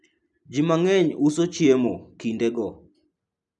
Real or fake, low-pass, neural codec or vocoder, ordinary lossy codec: real; 10.8 kHz; none; none